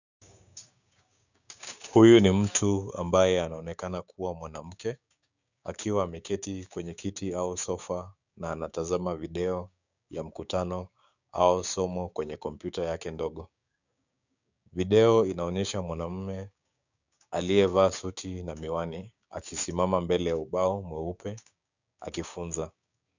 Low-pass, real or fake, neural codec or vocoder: 7.2 kHz; fake; codec, 16 kHz, 6 kbps, DAC